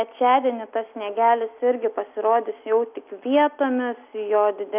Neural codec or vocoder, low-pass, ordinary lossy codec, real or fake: none; 3.6 kHz; AAC, 32 kbps; real